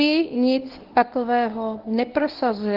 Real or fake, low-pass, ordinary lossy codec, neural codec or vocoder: fake; 5.4 kHz; Opus, 16 kbps; codec, 24 kHz, 0.9 kbps, WavTokenizer, medium speech release version 1